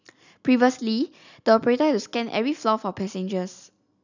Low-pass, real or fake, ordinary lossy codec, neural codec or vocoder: 7.2 kHz; real; none; none